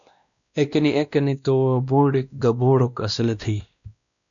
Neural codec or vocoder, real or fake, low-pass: codec, 16 kHz, 1 kbps, X-Codec, WavLM features, trained on Multilingual LibriSpeech; fake; 7.2 kHz